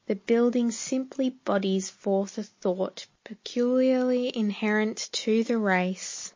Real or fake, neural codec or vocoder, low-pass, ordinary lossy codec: real; none; 7.2 kHz; MP3, 32 kbps